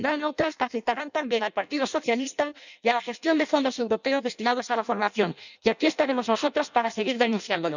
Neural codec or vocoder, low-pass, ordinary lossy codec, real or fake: codec, 16 kHz in and 24 kHz out, 0.6 kbps, FireRedTTS-2 codec; 7.2 kHz; none; fake